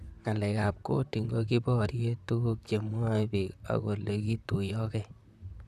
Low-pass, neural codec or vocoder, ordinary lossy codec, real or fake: 14.4 kHz; vocoder, 44.1 kHz, 128 mel bands, Pupu-Vocoder; none; fake